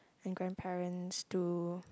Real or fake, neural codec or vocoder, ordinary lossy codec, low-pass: real; none; none; none